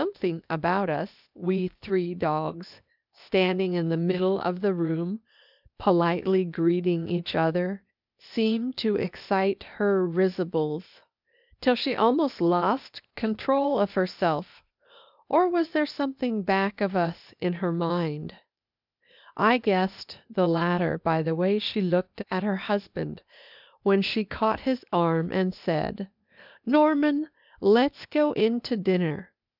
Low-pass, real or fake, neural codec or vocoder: 5.4 kHz; fake; codec, 16 kHz, 0.8 kbps, ZipCodec